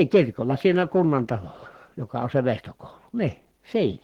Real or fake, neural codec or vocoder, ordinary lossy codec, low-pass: fake; codec, 44.1 kHz, 7.8 kbps, Pupu-Codec; Opus, 16 kbps; 19.8 kHz